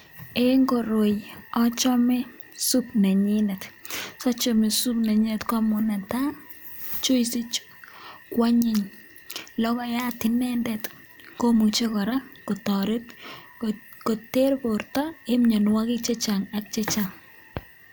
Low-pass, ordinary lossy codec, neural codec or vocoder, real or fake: none; none; none; real